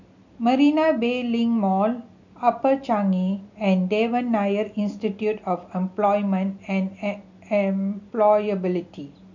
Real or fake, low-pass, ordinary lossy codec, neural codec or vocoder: real; 7.2 kHz; none; none